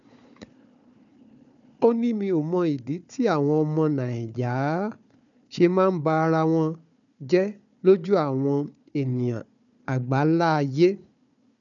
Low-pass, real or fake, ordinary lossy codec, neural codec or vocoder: 7.2 kHz; fake; none; codec, 16 kHz, 4 kbps, FunCodec, trained on Chinese and English, 50 frames a second